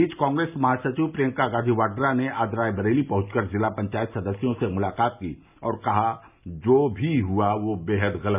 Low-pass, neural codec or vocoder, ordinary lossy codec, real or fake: 3.6 kHz; none; none; real